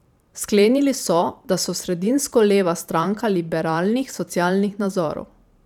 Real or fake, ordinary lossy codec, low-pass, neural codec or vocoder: fake; none; 19.8 kHz; vocoder, 44.1 kHz, 128 mel bands every 512 samples, BigVGAN v2